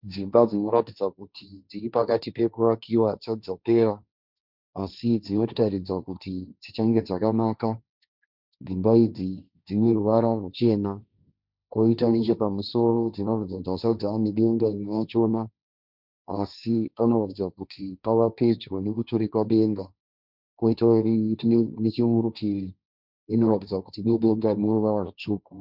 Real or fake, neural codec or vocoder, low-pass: fake; codec, 16 kHz, 1.1 kbps, Voila-Tokenizer; 5.4 kHz